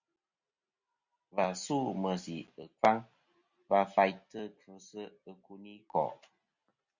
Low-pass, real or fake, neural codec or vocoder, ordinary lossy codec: 7.2 kHz; real; none; Opus, 64 kbps